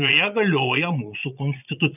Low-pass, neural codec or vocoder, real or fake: 3.6 kHz; vocoder, 22.05 kHz, 80 mel bands, Vocos; fake